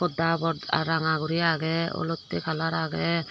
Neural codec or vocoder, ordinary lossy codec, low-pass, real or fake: none; none; none; real